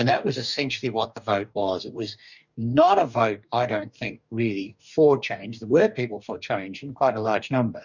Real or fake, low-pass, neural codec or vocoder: fake; 7.2 kHz; codec, 44.1 kHz, 2.6 kbps, DAC